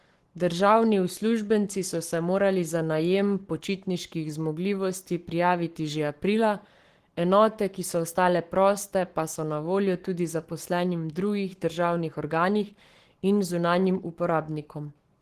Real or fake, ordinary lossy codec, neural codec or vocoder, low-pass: fake; Opus, 16 kbps; codec, 44.1 kHz, 7.8 kbps, Pupu-Codec; 14.4 kHz